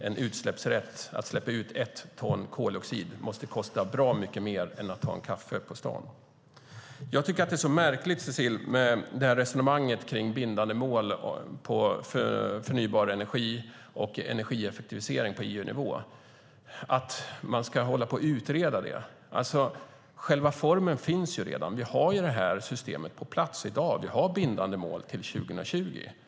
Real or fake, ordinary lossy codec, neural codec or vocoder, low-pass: real; none; none; none